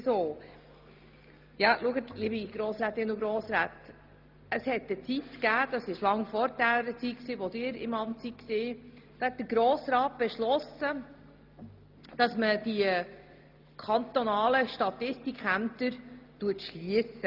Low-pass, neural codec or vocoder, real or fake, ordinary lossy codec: 5.4 kHz; none; real; Opus, 32 kbps